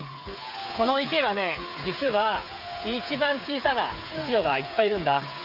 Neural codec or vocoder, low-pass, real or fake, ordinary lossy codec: codec, 16 kHz, 8 kbps, FreqCodec, smaller model; 5.4 kHz; fake; none